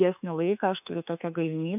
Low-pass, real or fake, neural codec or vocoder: 3.6 kHz; fake; autoencoder, 48 kHz, 32 numbers a frame, DAC-VAE, trained on Japanese speech